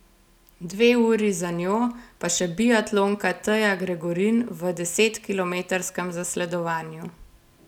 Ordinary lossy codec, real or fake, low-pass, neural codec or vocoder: none; real; 19.8 kHz; none